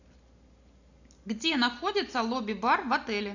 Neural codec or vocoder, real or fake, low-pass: none; real; 7.2 kHz